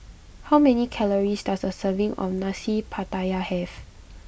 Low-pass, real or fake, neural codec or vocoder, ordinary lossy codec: none; real; none; none